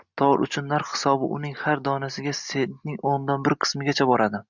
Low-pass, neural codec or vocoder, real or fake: 7.2 kHz; none; real